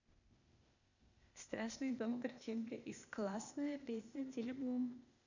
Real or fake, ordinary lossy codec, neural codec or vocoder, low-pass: fake; none; codec, 16 kHz, 0.8 kbps, ZipCodec; 7.2 kHz